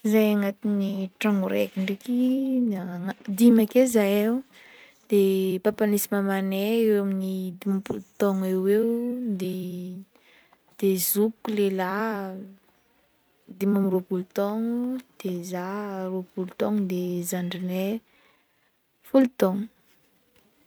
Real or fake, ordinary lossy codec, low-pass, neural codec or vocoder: fake; none; none; vocoder, 44.1 kHz, 128 mel bands every 256 samples, BigVGAN v2